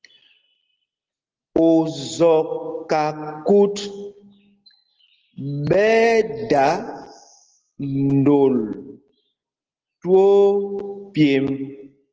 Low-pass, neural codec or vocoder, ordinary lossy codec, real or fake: 7.2 kHz; none; Opus, 16 kbps; real